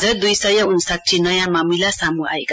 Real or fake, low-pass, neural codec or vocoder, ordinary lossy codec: real; none; none; none